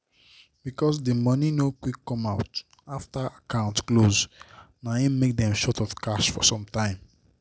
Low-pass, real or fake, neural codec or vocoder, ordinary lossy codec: none; real; none; none